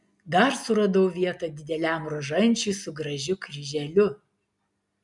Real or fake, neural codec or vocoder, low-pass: real; none; 10.8 kHz